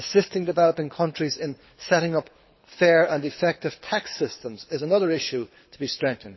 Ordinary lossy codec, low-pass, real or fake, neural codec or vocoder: MP3, 24 kbps; 7.2 kHz; fake; codec, 24 kHz, 6 kbps, HILCodec